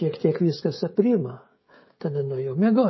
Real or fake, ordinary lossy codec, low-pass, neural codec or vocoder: fake; MP3, 24 kbps; 7.2 kHz; codec, 16 kHz, 16 kbps, FreqCodec, smaller model